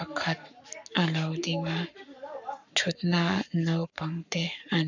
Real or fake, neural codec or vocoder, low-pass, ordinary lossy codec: fake; codec, 44.1 kHz, 7.8 kbps, Pupu-Codec; 7.2 kHz; none